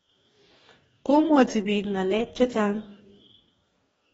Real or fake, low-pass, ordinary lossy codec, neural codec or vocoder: fake; 19.8 kHz; AAC, 24 kbps; codec, 44.1 kHz, 2.6 kbps, DAC